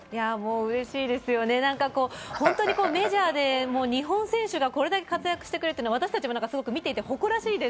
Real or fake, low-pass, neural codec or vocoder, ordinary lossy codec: real; none; none; none